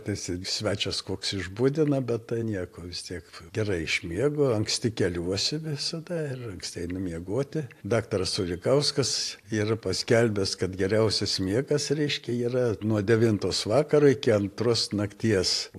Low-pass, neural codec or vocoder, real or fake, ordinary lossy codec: 14.4 kHz; none; real; AAC, 64 kbps